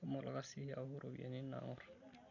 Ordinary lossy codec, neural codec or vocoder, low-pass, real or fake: none; none; 7.2 kHz; real